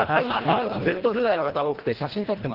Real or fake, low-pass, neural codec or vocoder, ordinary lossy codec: fake; 5.4 kHz; codec, 24 kHz, 1.5 kbps, HILCodec; Opus, 16 kbps